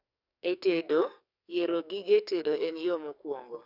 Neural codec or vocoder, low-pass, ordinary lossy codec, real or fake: codec, 44.1 kHz, 2.6 kbps, SNAC; 5.4 kHz; none; fake